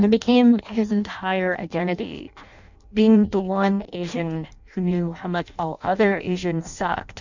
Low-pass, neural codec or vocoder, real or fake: 7.2 kHz; codec, 16 kHz in and 24 kHz out, 0.6 kbps, FireRedTTS-2 codec; fake